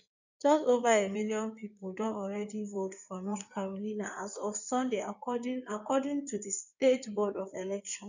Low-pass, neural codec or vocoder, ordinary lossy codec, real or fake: 7.2 kHz; codec, 16 kHz in and 24 kHz out, 2.2 kbps, FireRedTTS-2 codec; none; fake